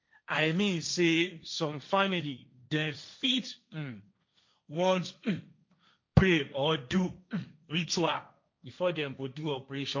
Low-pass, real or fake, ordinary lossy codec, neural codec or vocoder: 7.2 kHz; fake; MP3, 48 kbps; codec, 16 kHz, 1.1 kbps, Voila-Tokenizer